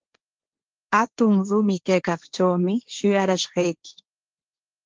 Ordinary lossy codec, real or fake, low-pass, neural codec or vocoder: Opus, 24 kbps; fake; 7.2 kHz; codec, 16 kHz, 1.1 kbps, Voila-Tokenizer